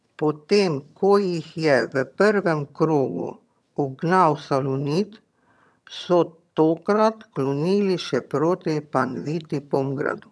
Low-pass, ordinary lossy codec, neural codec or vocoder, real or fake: none; none; vocoder, 22.05 kHz, 80 mel bands, HiFi-GAN; fake